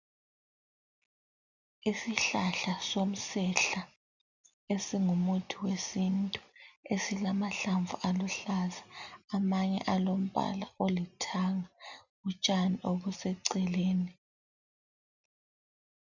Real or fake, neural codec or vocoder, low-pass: real; none; 7.2 kHz